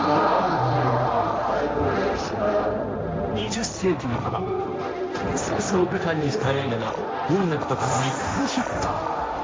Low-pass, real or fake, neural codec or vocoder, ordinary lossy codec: none; fake; codec, 16 kHz, 1.1 kbps, Voila-Tokenizer; none